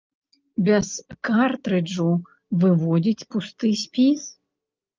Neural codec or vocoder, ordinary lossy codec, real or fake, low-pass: none; Opus, 24 kbps; real; 7.2 kHz